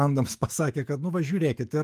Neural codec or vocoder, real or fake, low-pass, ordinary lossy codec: autoencoder, 48 kHz, 128 numbers a frame, DAC-VAE, trained on Japanese speech; fake; 14.4 kHz; Opus, 32 kbps